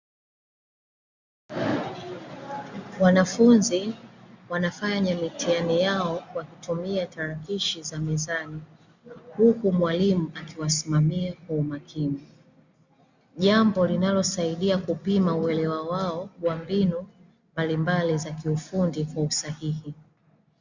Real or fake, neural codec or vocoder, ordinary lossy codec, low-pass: real; none; Opus, 64 kbps; 7.2 kHz